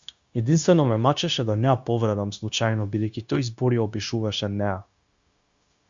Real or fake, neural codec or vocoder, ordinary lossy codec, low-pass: fake; codec, 16 kHz, 0.9 kbps, LongCat-Audio-Codec; Opus, 64 kbps; 7.2 kHz